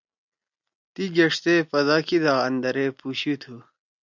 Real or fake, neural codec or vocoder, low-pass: real; none; 7.2 kHz